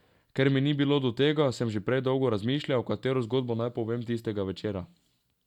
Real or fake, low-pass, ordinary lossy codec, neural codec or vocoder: real; 19.8 kHz; none; none